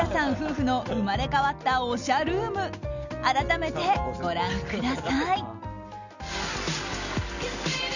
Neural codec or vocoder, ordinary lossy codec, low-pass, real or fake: none; none; 7.2 kHz; real